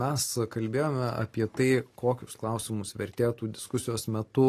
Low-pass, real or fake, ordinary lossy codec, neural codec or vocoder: 14.4 kHz; real; AAC, 48 kbps; none